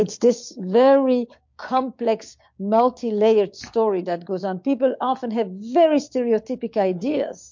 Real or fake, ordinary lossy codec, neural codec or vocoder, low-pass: fake; MP3, 48 kbps; codec, 16 kHz, 6 kbps, DAC; 7.2 kHz